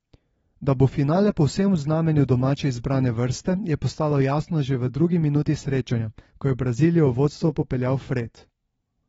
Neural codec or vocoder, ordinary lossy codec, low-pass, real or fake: none; AAC, 24 kbps; 19.8 kHz; real